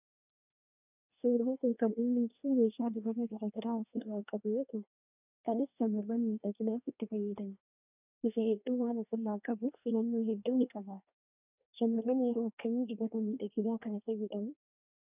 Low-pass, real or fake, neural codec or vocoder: 3.6 kHz; fake; codec, 24 kHz, 1 kbps, SNAC